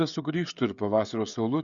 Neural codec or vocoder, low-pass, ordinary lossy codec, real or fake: codec, 16 kHz, 16 kbps, FreqCodec, smaller model; 7.2 kHz; Opus, 64 kbps; fake